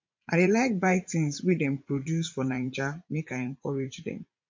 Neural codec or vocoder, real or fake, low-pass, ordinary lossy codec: vocoder, 44.1 kHz, 80 mel bands, Vocos; fake; 7.2 kHz; MP3, 48 kbps